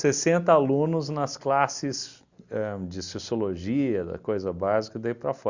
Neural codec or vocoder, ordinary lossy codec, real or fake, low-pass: none; Opus, 64 kbps; real; 7.2 kHz